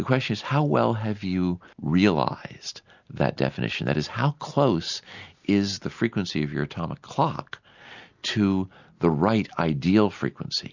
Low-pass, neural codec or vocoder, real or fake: 7.2 kHz; none; real